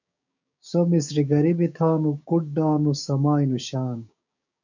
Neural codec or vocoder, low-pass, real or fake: codec, 16 kHz, 6 kbps, DAC; 7.2 kHz; fake